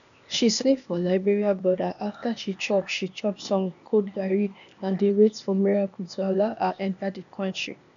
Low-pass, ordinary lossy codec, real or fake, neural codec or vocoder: 7.2 kHz; none; fake; codec, 16 kHz, 0.8 kbps, ZipCodec